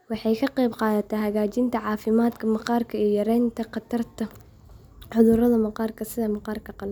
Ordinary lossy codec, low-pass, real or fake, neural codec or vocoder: none; none; real; none